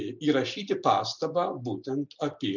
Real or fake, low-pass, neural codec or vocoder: real; 7.2 kHz; none